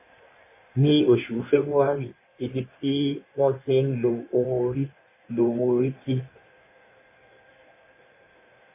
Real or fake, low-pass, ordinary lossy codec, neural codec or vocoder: fake; 3.6 kHz; MP3, 24 kbps; codec, 16 kHz in and 24 kHz out, 1.1 kbps, FireRedTTS-2 codec